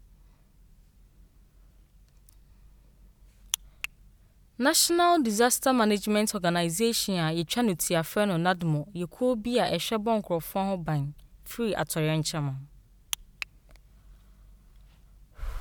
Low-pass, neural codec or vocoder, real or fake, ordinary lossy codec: none; none; real; none